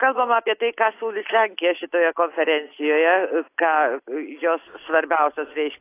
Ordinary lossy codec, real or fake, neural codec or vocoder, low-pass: AAC, 24 kbps; real; none; 3.6 kHz